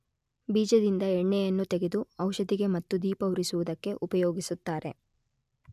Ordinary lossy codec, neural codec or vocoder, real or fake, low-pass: none; none; real; 14.4 kHz